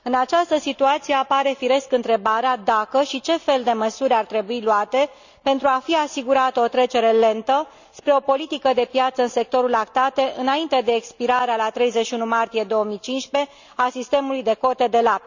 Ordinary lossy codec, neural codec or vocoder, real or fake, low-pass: none; none; real; 7.2 kHz